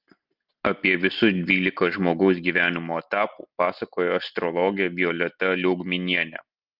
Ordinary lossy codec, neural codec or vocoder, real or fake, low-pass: Opus, 32 kbps; none; real; 5.4 kHz